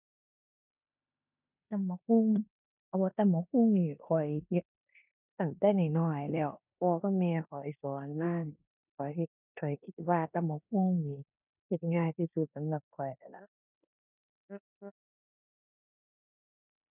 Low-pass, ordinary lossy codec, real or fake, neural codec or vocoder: 3.6 kHz; none; fake; codec, 16 kHz in and 24 kHz out, 0.9 kbps, LongCat-Audio-Codec, four codebook decoder